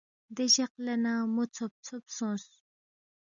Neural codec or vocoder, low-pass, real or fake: none; 7.2 kHz; real